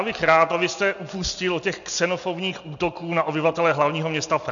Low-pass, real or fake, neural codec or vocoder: 7.2 kHz; real; none